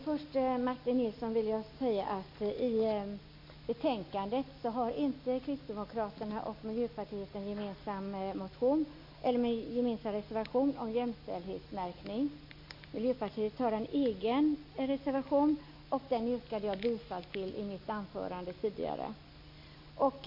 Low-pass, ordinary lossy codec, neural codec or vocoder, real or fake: 5.4 kHz; MP3, 32 kbps; none; real